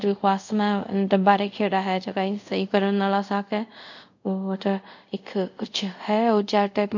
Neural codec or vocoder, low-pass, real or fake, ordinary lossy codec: codec, 24 kHz, 0.5 kbps, DualCodec; 7.2 kHz; fake; none